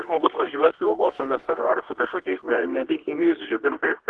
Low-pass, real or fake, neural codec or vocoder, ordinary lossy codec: 10.8 kHz; fake; codec, 24 kHz, 0.9 kbps, WavTokenizer, medium music audio release; Opus, 16 kbps